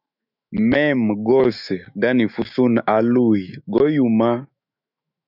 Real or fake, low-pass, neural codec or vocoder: fake; 5.4 kHz; autoencoder, 48 kHz, 128 numbers a frame, DAC-VAE, trained on Japanese speech